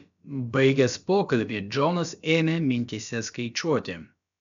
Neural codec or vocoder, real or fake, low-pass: codec, 16 kHz, about 1 kbps, DyCAST, with the encoder's durations; fake; 7.2 kHz